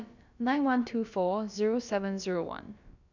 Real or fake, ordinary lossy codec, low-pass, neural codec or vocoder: fake; none; 7.2 kHz; codec, 16 kHz, about 1 kbps, DyCAST, with the encoder's durations